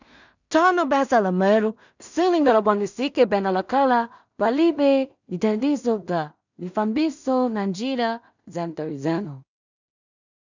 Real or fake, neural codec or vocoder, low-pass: fake; codec, 16 kHz in and 24 kHz out, 0.4 kbps, LongCat-Audio-Codec, two codebook decoder; 7.2 kHz